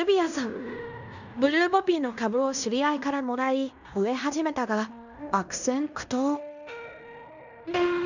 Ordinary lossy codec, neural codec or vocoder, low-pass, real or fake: none; codec, 16 kHz in and 24 kHz out, 0.9 kbps, LongCat-Audio-Codec, fine tuned four codebook decoder; 7.2 kHz; fake